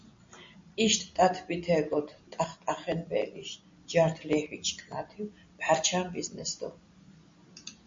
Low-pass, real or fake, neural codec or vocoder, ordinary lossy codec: 7.2 kHz; real; none; MP3, 48 kbps